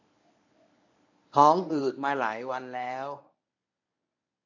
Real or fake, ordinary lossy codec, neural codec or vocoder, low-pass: fake; AAC, 48 kbps; codec, 24 kHz, 0.9 kbps, WavTokenizer, medium speech release version 1; 7.2 kHz